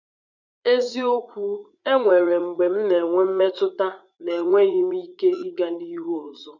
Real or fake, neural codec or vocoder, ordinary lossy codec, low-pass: fake; vocoder, 44.1 kHz, 80 mel bands, Vocos; none; 7.2 kHz